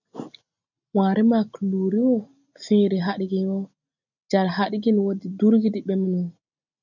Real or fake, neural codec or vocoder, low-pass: real; none; 7.2 kHz